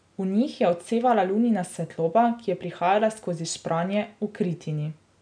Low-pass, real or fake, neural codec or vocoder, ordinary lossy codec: 9.9 kHz; real; none; none